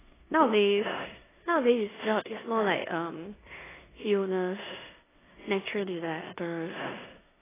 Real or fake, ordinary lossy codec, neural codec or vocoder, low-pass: fake; AAC, 16 kbps; codec, 16 kHz in and 24 kHz out, 0.9 kbps, LongCat-Audio-Codec, four codebook decoder; 3.6 kHz